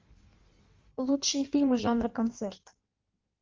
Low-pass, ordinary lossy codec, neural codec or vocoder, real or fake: 7.2 kHz; Opus, 32 kbps; codec, 16 kHz in and 24 kHz out, 1.1 kbps, FireRedTTS-2 codec; fake